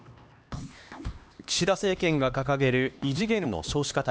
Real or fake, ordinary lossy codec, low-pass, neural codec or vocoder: fake; none; none; codec, 16 kHz, 2 kbps, X-Codec, HuBERT features, trained on LibriSpeech